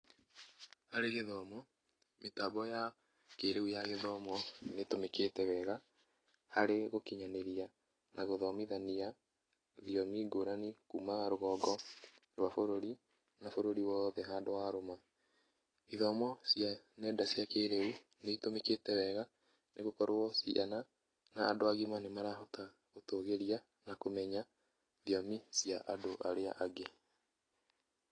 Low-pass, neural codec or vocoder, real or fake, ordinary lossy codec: 9.9 kHz; none; real; AAC, 32 kbps